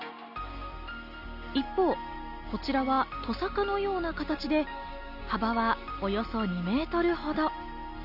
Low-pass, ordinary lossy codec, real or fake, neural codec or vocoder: 5.4 kHz; none; real; none